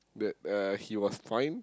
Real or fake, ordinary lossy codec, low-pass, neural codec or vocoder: real; none; none; none